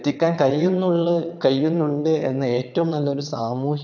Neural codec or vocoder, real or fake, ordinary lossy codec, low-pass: vocoder, 22.05 kHz, 80 mel bands, WaveNeXt; fake; none; 7.2 kHz